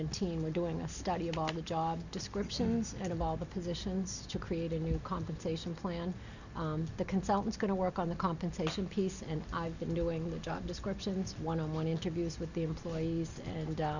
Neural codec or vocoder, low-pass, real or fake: none; 7.2 kHz; real